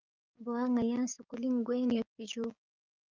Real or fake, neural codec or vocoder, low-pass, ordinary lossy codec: fake; codec, 16 kHz, 6 kbps, DAC; 7.2 kHz; Opus, 32 kbps